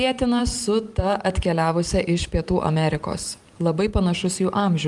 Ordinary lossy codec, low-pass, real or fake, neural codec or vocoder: Opus, 32 kbps; 10.8 kHz; fake; vocoder, 44.1 kHz, 128 mel bands every 512 samples, BigVGAN v2